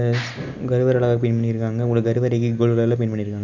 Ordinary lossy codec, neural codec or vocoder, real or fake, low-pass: none; none; real; 7.2 kHz